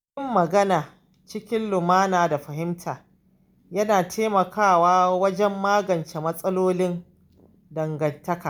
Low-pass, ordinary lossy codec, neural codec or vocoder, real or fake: none; none; none; real